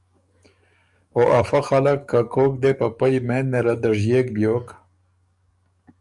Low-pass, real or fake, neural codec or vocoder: 10.8 kHz; fake; codec, 44.1 kHz, 7.8 kbps, DAC